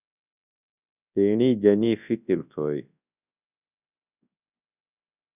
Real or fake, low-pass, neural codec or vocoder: fake; 3.6 kHz; codec, 24 kHz, 0.9 kbps, WavTokenizer, large speech release